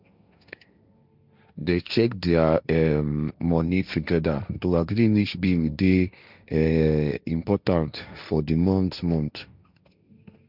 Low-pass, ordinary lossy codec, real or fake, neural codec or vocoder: 5.4 kHz; none; fake; codec, 16 kHz, 1.1 kbps, Voila-Tokenizer